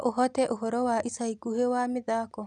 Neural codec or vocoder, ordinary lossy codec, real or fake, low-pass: none; none; real; 10.8 kHz